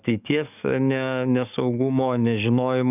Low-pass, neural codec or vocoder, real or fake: 3.6 kHz; codec, 44.1 kHz, 7.8 kbps, DAC; fake